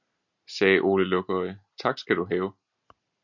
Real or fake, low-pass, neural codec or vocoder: real; 7.2 kHz; none